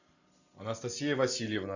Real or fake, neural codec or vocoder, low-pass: real; none; 7.2 kHz